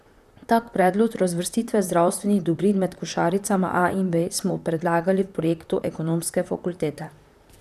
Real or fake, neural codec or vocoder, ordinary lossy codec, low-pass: fake; vocoder, 44.1 kHz, 128 mel bands, Pupu-Vocoder; none; 14.4 kHz